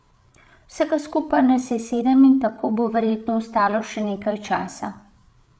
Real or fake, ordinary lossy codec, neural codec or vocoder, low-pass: fake; none; codec, 16 kHz, 8 kbps, FreqCodec, larger model; none